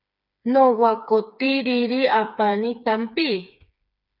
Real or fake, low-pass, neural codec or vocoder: fake; 5.4 kHz; codec, 16 kHz, 4 kbps, FreqCodec, smaller model